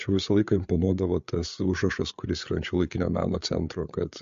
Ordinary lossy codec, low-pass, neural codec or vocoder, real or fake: MP3, 48 kbps; 7.2 kHz; codec, 16 kHz, 8 kbps, FreqCodec, larger model; fake